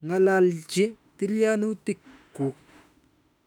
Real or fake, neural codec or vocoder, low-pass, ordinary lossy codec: fake; autoencoder, 48 kHz, 32 numbers a frame, DAC-VAE, trained on Japanese speech; 19.8 kHz; none